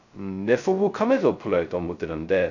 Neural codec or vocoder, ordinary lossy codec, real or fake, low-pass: codec, 16 kHz, 0.2 kbps, FocalCodec; none; fake; 7.2 kHz